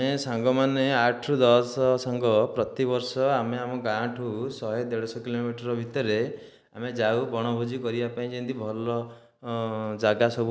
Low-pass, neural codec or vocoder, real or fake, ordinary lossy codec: none; none; real; none